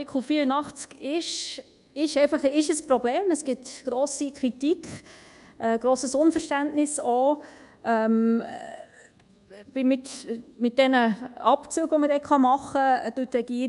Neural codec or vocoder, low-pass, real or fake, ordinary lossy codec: codec, 24 kHz, 1.2 kbps, DualCodec; 10.8 kHz; fake; none